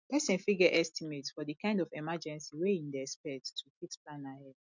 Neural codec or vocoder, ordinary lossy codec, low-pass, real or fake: none; none; 7.2 kHz; real